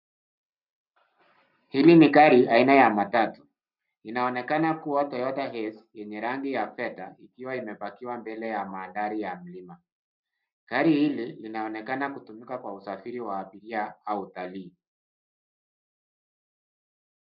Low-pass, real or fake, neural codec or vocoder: 5.4 kHz; real; none